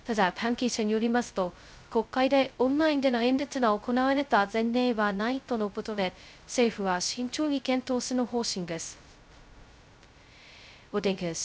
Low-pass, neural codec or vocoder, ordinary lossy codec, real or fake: none; codec, 16 kHz, 0.2 kbps, FocalCodec; none; fake